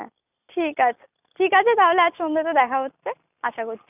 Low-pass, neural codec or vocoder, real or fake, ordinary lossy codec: 3.6 kHz; none; real; none